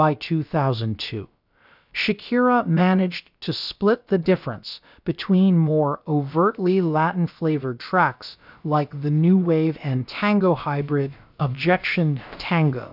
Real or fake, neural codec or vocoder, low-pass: fake; codec, 16 kHz, about 1 kbps, DyCAST, with the encoder's durations; 5.4 kHz